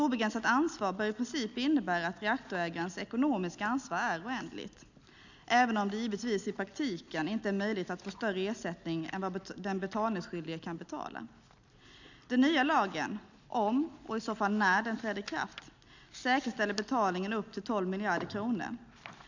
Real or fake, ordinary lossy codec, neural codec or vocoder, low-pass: real; none; none; 7.2 kHz